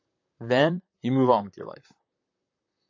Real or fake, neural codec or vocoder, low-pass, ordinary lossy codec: fake; vocoder, 44.1 kHz, 128 mel bands, Pupu-Vocoder; 7.2 kHz; AAC, 48 kbps